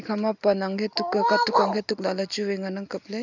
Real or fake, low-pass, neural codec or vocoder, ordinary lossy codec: real; 7.2 kHz; none; none